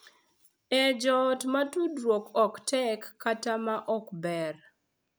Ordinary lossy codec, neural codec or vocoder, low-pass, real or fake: none; none; none; real